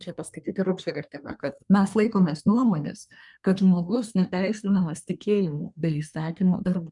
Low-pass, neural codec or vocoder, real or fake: 10.8 kHz; codec, 24 kHz, 1 kbps, SNAC; fake